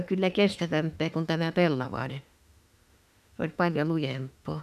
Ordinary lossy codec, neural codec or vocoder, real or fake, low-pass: none; autoencoder, 48 kHz, 32 numbers a frame, DAC-VAE, trained on Japanese speech; fake; 14.4 kHz